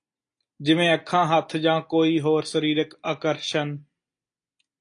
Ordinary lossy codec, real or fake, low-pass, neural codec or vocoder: AAC, 48 kbps; real; 9.9 kHz; none